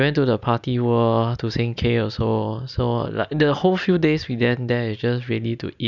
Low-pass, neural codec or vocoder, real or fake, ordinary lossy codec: 7.2 kHz; none; real; none